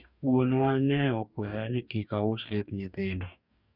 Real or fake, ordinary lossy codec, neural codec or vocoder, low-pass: fake; none; codec, 44.1 kHz, 2.6 kbps, DAC; 5.4 kHz